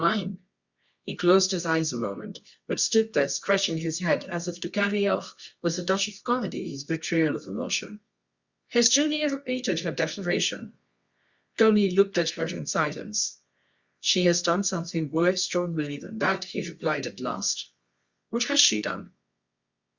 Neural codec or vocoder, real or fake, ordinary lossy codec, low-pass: codec, 24 kHz, 0.9 kbps, WavTokenizer, medium music audio release; fake; Opus, 64 kbps; 7.2 kHz